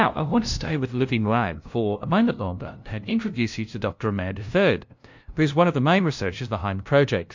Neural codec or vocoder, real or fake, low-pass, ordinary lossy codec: codec, 16 kHz, 0.5 kbps, FunCodec, trained on LibriTTS, 25 frames a second; fake; 7.2 kHz; MP3, 48 kbps